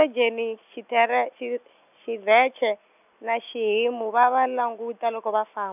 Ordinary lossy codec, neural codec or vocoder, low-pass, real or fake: none; none; 3.6 kHz; real